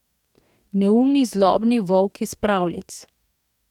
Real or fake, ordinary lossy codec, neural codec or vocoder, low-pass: fake; none; codec, 44.1 kHz, 2.6 kbps, DAC; 19.8 kHz